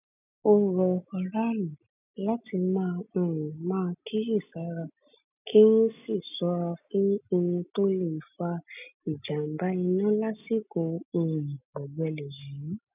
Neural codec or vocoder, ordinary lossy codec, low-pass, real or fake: none; none; 3.6 kHz; real